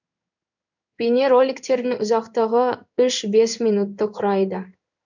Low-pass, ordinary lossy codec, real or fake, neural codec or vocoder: 7.2 kHz; none; fake; codec, 16 kHz in and 24 kHz out, 1 kbps, XY-Tokenizer